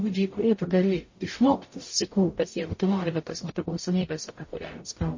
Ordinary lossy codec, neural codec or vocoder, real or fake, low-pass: MP3, 32 kbps; codec, 44.1 kHz, 0.9 kbps, DAC; fake; 7.2 kHz